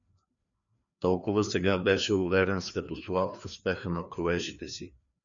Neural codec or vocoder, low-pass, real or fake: codec, 16 kHz, 2 kbps, FreqCodec, larger model; 7.2 kHz; fake